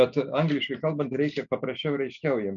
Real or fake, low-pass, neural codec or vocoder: real; 7.2 kHz; none